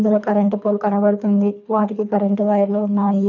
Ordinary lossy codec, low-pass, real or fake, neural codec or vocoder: none; 7.2 kHz; fake; codec, 24 kHz, 3 kbps, HILCodec